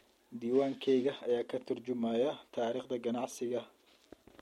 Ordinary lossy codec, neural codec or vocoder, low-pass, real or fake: MP3, 64 kbps; none; 19.8 kHz; real